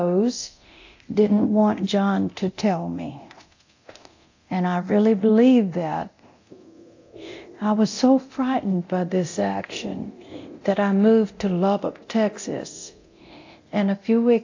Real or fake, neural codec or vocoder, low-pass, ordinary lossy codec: fake; codec, 24 kHz, 0.9 kbps, DualCodec; 7.2 kHz; AAC, 48 kbps